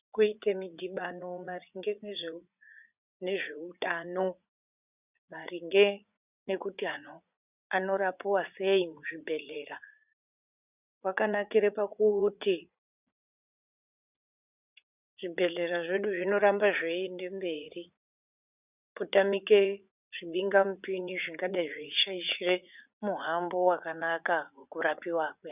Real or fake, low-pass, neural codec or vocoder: fake; 3.6 kHz; vocoder, 44.1 kHz, 80 mel bands, Vocos